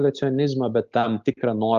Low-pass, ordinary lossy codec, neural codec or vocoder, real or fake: 7.2 kHz; Opus, 32 kbps; none; real